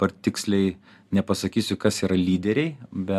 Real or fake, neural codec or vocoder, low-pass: real; none; 14.4 kHz